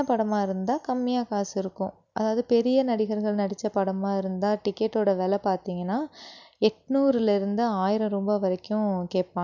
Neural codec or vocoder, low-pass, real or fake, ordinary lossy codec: none; 7.2 kHz; real; none